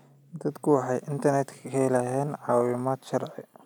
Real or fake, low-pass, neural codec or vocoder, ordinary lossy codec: real; none; none; none